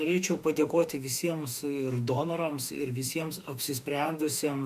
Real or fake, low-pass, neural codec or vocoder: fake; 14.4 kHz; autoencoder, 48 kHz, 32 numbers a frame, DAC-VAE, trained on Japanese speech